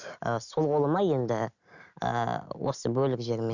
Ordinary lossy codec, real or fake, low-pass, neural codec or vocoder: none; real; 7.2 kHz; none